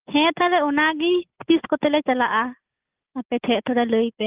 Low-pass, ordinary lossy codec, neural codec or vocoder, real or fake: 3.6 kHz; Opus, 32 kbps; none; real